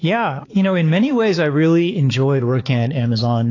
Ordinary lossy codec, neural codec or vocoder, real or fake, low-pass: AAC, 32 kbps; codec, 16 kHz, 4 kbps, X-Codec, HuBERT features, trained on balanced general audio; fake; 7.2 kHz